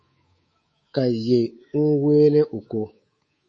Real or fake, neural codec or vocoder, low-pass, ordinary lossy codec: fake; codec, 24 kHz, 3.1 kbps, DualCodec; 9.9 kHz; MP3, 32 kbps